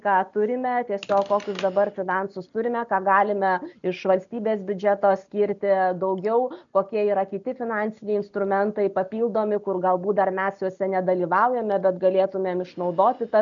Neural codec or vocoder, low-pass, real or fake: none; 7.2 kHz; real